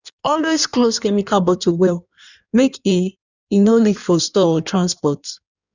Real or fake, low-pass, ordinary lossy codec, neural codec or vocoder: fake; 7.2 kHz; none; codec, 16 kHz in and 24 kHz out, 1.1 kbps, FireRedTTS-2 codec